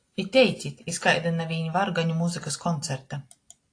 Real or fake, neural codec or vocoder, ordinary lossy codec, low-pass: real; none; AAC, 48 kbps; 9.9 kHz